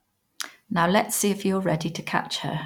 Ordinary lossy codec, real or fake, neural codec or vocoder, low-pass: none; real; none; 19.8 kHz